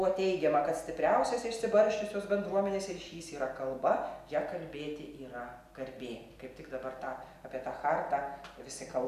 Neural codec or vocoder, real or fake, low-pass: none; real; 14.4 kHz